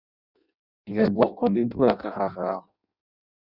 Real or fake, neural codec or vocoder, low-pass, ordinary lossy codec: fake; codec, 16 kHz in and 24 kHz out, 0.6 kbps, FireRedTTS-2 codec; 5.4 kHz; Opus, 64 kbps